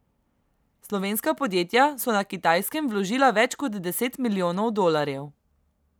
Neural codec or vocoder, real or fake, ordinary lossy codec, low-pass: none; real; none; none